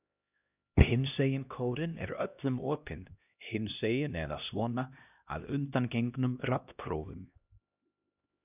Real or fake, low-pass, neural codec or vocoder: fake; 3.6 kHz; codec, 16 kHz, 1 kbps, X-Codec, HuBERT features, trained on LibriSpeech